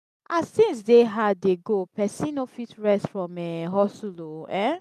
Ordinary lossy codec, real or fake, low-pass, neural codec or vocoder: none; real; 14.4 kHz; none